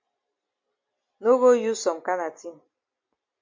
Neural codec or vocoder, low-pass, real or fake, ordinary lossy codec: none; 7.2 kHz; real; MP3, 48 kbps